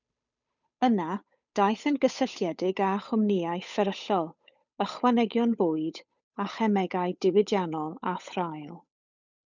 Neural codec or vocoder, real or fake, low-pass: codec, 16 kHz, 8 kbps, FunCodec, trained on Chinese and English, 25 frames a second; fake; 7.2 kHz